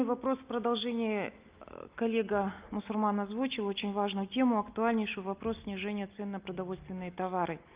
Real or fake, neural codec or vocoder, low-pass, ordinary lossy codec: real; none; 3.6 kHz; Opus, 24 kbps